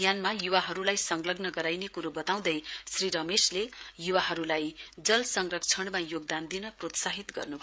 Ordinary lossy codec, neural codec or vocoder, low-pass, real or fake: none; codec, 16 kHz, 16 kbps, FreqCodec, smaller model; none; fake